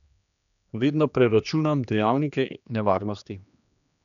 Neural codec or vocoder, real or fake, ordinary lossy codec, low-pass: codec, 16 kHz, 2 kbps, X-Codec, HuBERT features, trained on general audio; fake; none; 7.2 kHz